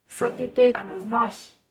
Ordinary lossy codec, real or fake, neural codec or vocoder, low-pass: none; fake; codec, 44.1 kHz, 0.9 kbps, DAC; 19.8 kHz